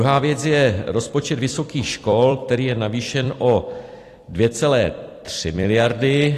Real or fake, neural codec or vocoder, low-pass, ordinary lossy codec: fake; vocoder, 44.1 kHz, 128 mel bands every 256 samples, BigVGAN v2; 14.4 kHz; AAC, 48 kbps